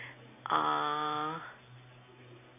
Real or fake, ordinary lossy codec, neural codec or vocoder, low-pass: real; none; none; 3.6 kHz